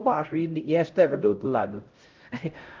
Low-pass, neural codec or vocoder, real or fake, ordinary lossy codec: 7.2 kHz; codec, 16 kHz, 0.5 kbps, X-Codec, HuBERT features, trained on LibriSpeech; fake; Opus, 32 kbps